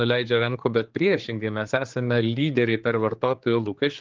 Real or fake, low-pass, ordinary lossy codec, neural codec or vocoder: fake; 7.2 kHz; Opus, 32 kbps; codec, 16 kHz, 4 kbps, X-Codec, HuBERT features, trained on general audio